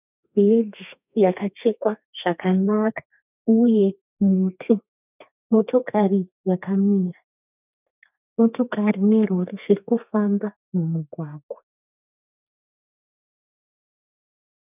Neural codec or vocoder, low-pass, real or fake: codec, 32 kHz, 1.9 kbps, SNAC; 3.6 kHz; fake